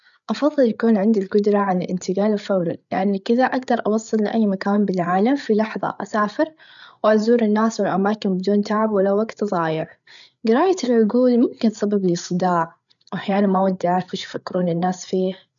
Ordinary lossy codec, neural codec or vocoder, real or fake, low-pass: none; codec, 16 kHz, 8 kbps, FreqCodec, larger model; fake; 7.2 kHz